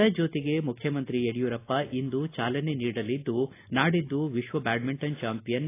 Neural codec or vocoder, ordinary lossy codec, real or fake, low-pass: none; AAC, 24 kbps; real; 3.6 kHz